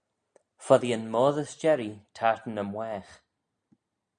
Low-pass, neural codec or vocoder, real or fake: 9.9 kHz; none; real